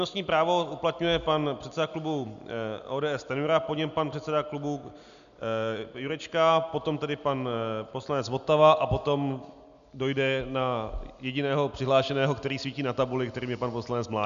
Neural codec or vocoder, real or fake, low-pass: none; real; 7.2 kHz